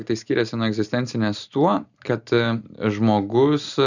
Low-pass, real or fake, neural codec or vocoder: 7.2 kHz; real; none